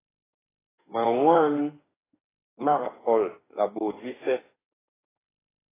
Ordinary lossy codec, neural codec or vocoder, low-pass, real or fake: AAC, 16 kbps; autoencoder, 48 kHz, 32 numbers a frame, DAC-VAE, trained on Japanese speech; 3.6 kHz; fake